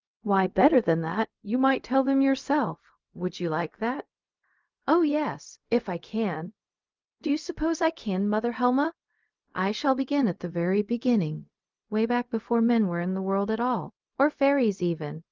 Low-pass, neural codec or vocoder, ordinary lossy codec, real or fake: 7.2 kHz; codec, 16 kHz, 0.4 kbps, LongCat-Audio-Codec; Opus, 32 kbps; fake